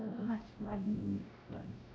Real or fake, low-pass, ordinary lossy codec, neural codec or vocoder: fake; none; none; codec, 16 kHz, 0.5 kbps, X-Codec, WavLM features, trained on Multilingual LibriSpeech